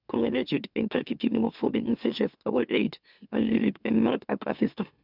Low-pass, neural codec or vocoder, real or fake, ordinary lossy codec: 5.4 kHz; autoencoder, 44.1 kHz, a latent of 192 numbers a frame, MeloTTS; fake; none